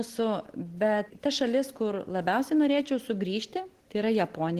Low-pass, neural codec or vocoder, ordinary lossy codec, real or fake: 14.4 kHz; none; Opus, 16 kbps; real